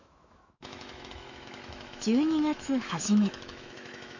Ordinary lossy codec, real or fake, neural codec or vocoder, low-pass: none; fake; autoencoder, 48 kHz, 128 numbers a frame, DAC-VAE, trained on Japanese speech; 7.2 kHz